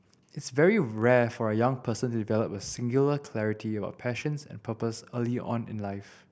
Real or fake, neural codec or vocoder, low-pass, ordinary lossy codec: real; none; none; none